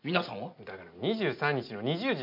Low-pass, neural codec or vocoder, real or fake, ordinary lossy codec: 5.4 kHz; none; real; MP3, 48 kbps